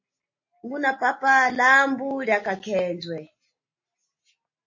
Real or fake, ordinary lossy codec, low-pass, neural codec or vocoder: real; MP3, 32 kbps; 7.2 kHz; none